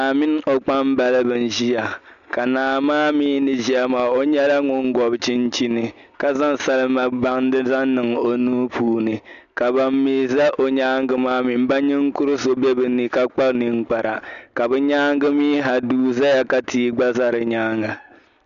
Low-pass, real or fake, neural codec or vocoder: 7.2 kHz; real; none